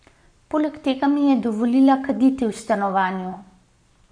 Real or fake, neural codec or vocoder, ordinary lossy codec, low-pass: fake; codec, 44.1 kHz, 7.8 kbps, Pupu-Codec; none; 9.9 kHz